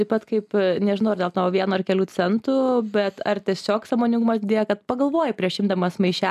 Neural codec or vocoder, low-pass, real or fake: none; 14.4 kHz; real